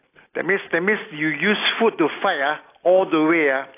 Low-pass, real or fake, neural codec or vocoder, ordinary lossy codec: 3.6 kHz; real; none; AAC, 24 kbps